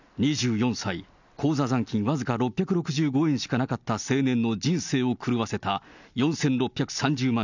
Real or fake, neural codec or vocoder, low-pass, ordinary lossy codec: real; none; 7.2 kHz; none